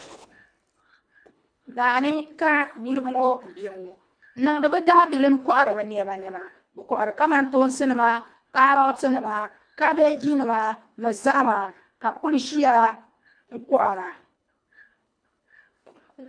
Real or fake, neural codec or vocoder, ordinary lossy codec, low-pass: fake; codec, 24 kHz, 1.5 kbps, HILCodec; MP3, 64 kbps; 9.9 kHz